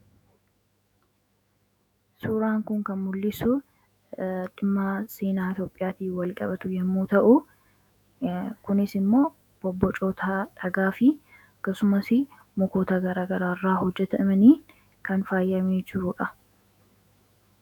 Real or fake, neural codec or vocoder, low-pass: fake; autoencoder, 48 kHz, 128 numbers a frame, DAC-VAE, trained on Japanese speech; 19.8 kHz